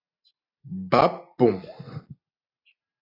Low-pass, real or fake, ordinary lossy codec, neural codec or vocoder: 5.4 kHz; real; AAC, 24 kbps; none